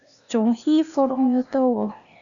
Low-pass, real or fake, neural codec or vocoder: 7.2 kHz; fake; codec, 16 kHz, 0.8 kbps, ZipCodec